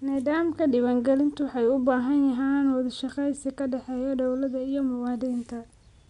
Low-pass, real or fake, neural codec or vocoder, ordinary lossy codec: 10.8 kHz; real; none; none